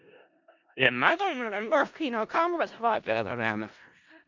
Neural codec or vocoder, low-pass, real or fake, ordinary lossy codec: codec, 16 kHz in and 24 kHz out, 0.4 kbps, LongCat-Audio-Codec, four codebook decoder; 7.2 kHz; fake; MP3, 64 kbps